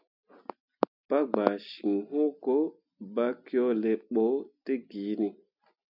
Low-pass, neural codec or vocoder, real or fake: 5.4 kHz; none; real